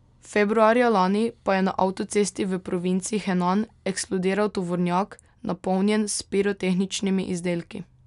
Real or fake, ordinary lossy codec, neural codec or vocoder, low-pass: real; none; none; 10.8 kHz